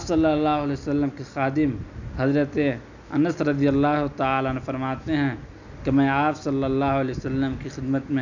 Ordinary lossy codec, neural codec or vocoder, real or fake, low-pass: none; none; real; 7.2 kHz